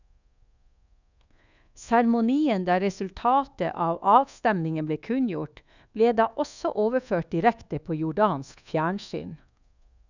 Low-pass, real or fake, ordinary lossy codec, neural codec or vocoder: 7.2 kHz; fake; none; codec, 24 kHz, 1.2 kbps, DualCodec